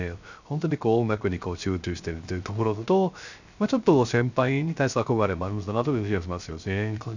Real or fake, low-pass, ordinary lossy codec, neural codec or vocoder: fake; 7.2 kHz; none; codec, 16 kHz, 0.3 kbps, FocalCodec